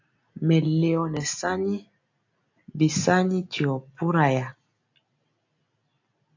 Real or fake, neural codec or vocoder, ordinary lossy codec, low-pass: real; none; AAC, 48 kbps; 7.2 kHz